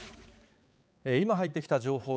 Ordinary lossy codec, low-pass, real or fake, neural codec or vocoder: none; none; fake; codec, 16 kHz, 4 kbps, X-Codec, HuBERT features, trained on balanced general audio